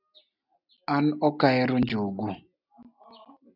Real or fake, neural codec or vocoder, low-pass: real; none; 5.4 kHz